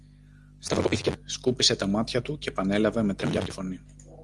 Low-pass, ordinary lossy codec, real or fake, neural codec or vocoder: 10.8 kHz; Opus, 24 kbps; real; none